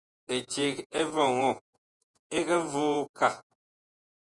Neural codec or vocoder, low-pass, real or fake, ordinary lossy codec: vocoder, 48 kHz, 128 mel bands, Vocos; 10.8 kHz; fake; Opus, 64 kbps